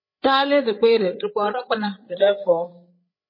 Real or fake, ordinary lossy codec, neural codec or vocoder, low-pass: fake; MP3, 24 kbps; codec, 16 kHz, 8 kbps, FreqCodec, larger model; 5.4 kHz